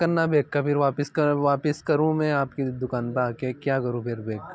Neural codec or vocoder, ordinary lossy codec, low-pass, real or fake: none; none; none; real